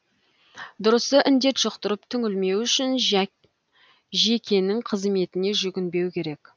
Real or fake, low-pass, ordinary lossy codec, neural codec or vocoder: real; none; none; none